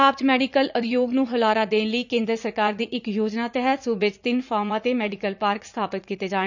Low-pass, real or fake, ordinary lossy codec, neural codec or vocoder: 7.2 kHz; fake; none; vocoder, 44.1 kHz, 80 mel bands, Vocos